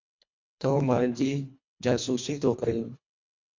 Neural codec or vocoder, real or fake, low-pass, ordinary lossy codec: codec, 24 kHz, 1.5 kbps, HILCodec; fake; 7.2 kHz; MP3, 48 kbps